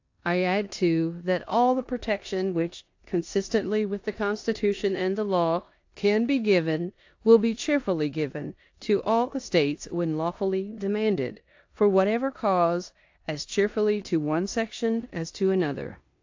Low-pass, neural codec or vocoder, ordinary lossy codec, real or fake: 7.2 kHz; codec, 16 kHz in and 24 kHz out, 0.9 kbps, LongCat-Audio-Codec, four codebook decoder; AAC, 48 kbps; fake